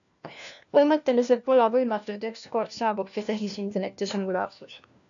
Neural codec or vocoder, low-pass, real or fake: codec, 16 kHz, 1 kbps, FunCodec, trained on LibriTTS, 50 frames a second; 7.2 kHz; fake